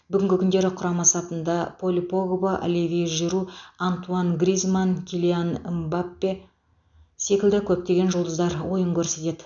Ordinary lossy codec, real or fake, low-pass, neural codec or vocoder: none; real; 7.2 kHz; none